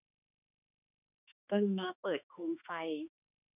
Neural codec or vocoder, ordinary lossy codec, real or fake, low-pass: autoencoder, 48 kHz, 32 numbers a frame, DAC-VAE, trained on Japanese speech; none; fake; 3.6 kHz